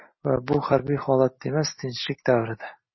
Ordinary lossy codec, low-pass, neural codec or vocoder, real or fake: MP3, 24 kbps; 7.2 kHz; none; real